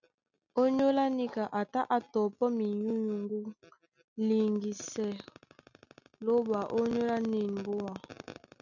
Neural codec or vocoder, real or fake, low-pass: none; real; 7.2 kHz